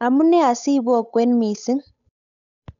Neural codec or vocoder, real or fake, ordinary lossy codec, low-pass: codec, 16 kHz, 8 kbps, FunCodec, trained on Chinese and English, 25 frames a second; fake; none; 7.2 kHz